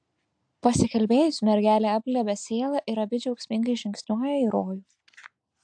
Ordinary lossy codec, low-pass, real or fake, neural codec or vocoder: AAC, 64 kbps; 9.9 kHz; real; none